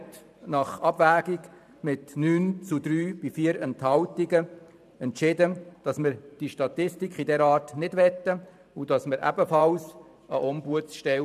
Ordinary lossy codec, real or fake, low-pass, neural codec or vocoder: none; fake; 14.4 kHz; vocoder, 44.1 kHz, 128 mel bands every 512 samples, BigVGAN v2